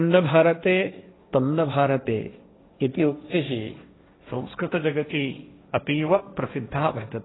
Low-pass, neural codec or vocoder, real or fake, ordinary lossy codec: 7.2 kHz; codec, 16 kHz, 1.1 kbps, Voila-Tokenizer; fake; AAC, 16 kbps